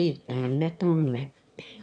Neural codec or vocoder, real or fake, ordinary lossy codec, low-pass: autoencoder, 22.05 kHz, a latent of 192 numbers a frame, VITS, trained on one speaker; fake; MP3, 96 kbps; 9.9 kHz